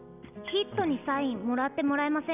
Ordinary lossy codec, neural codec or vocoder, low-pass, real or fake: none; none; 3.6 kHz; real